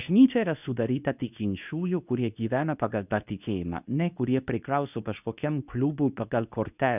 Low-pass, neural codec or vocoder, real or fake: 3.6 kHz; codec, 24 kHz, 0.9 kbps, WavTokenizer, medium speech release version 2; fake